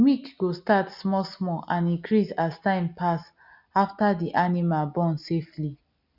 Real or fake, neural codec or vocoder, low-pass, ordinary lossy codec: real; none; 5.4 kHz; none